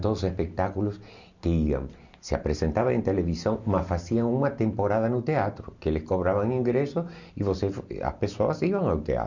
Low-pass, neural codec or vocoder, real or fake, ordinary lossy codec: 7.2 kHz; none; real; none